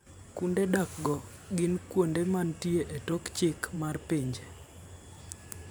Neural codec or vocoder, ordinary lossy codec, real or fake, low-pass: vocoder, 44.1 kHz, 128 mel bands every 512 samples, BigVGAN v2; none; fake; none